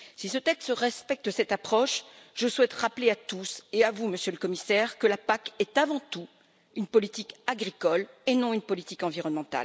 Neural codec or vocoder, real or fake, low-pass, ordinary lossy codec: none; real; none; none